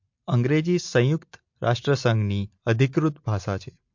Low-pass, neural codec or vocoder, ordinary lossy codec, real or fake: 7.2 kHz; none; MP3, 48 kbps; real